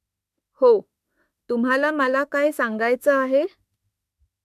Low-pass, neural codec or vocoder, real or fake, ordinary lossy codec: 14.4 kHz; autoencoder, 48 kHz, 32 numbers a frame, DAC-VAE, trained on Japanese speech; fake; MP3, 96 kbps